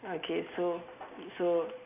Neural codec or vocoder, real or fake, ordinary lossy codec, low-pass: none; real; none; 3.6 kHz